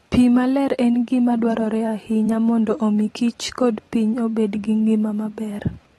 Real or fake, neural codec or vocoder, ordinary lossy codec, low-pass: fake; vocoder, 44.1 kHz, 128 mel bands every 512 samples, BigVGAN v2; AAC, 32 kbps; 19.8 kHz